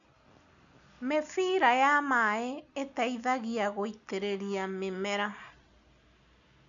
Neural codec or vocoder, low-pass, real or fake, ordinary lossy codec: none; 7.2 kHz; real; none